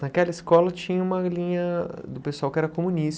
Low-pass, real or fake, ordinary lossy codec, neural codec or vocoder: none; real; none; none